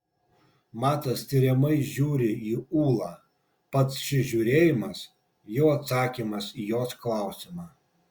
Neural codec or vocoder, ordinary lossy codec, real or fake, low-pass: none; Opus, 64 kbps; real; 19.8 kHz